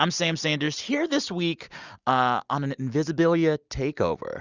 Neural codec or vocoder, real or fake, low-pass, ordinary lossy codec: none; real; 7.2 kHz; Opus, 64 kbps